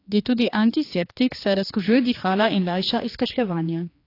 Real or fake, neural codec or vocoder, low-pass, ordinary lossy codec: fake; codec, 16 kHz, 2 kbps, X-Codec, HuBERT features, trained on general audio; 5.4 kHz; AAC, 32 kbps